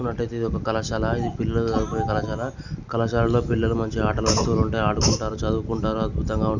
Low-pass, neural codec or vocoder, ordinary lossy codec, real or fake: 7.2 kHz; none; none; real